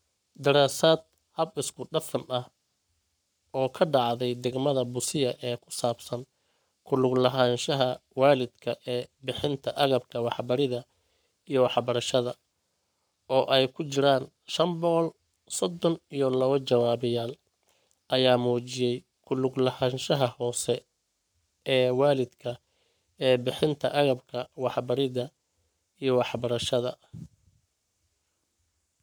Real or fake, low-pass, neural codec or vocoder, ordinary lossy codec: fake; none; codec, 44.1 kHz, 7.8 kbps, Pupu-Codec; none